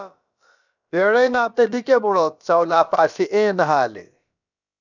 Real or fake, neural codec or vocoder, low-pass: fake; codec, 16 kHz, about 1 kbps, DyCAST, with the encoder's durations; 7.2 kHz